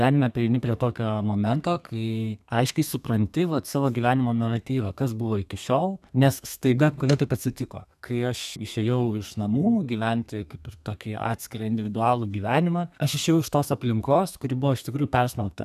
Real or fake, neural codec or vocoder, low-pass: fake; codec, 32 kHz, 1.9 kbps, SNAC; 14.4 kHz